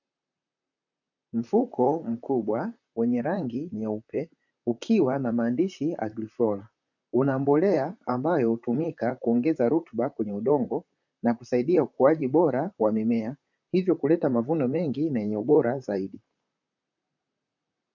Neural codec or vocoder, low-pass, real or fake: vocoder, 44.1 kHz, 128 mel bands, Pupu-Vocoder; 7.2 kHz; fake